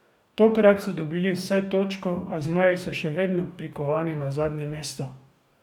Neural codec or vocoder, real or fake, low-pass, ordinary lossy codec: codec, 44.1 kHz, 2.6 kbps, DAC; fake; 19.8 kHz; none